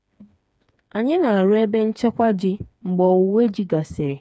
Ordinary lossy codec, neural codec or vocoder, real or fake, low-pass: none; codec, 16 kHz, 4 kbps, FreqCodec, smaller model; fake; none